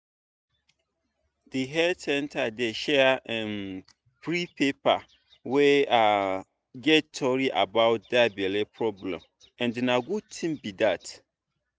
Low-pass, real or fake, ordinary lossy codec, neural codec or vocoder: none; real; none; none